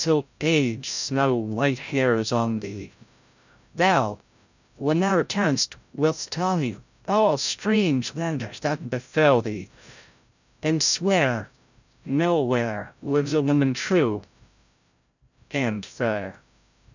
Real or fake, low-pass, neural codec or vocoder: fake; 7.2 kHz; codec, 16 kHz, 0.5 kbps, FreqCodec, larger model